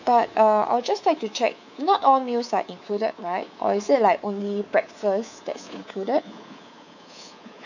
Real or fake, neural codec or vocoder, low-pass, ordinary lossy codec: fake; codec, 24 kHz, 3.1 kbps, DualCodec; 7.2 kHz; none